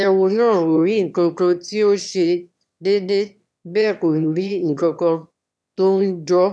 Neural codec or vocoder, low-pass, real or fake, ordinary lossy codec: autoencoder, 22.05 kHz, a latent of 192 numbers a frame, VITS, trained on one speaker; none; fake; none